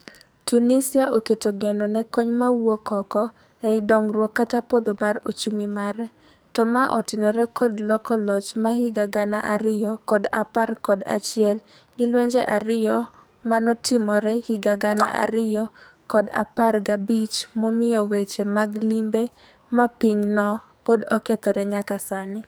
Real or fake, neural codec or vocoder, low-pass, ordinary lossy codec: fake; codec, 44.1 kHz, 2.6 kbps, SNAC; none; none